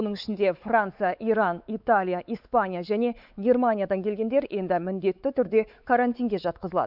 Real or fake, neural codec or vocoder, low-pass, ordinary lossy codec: fake; codec, 16 kHz, 4 kbps, X-Codec, WavLM features, trained on Multilingual LibriSpeech; 5.4 kHz; none